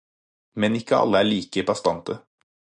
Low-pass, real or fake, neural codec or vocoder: 9.9 kHz; real; none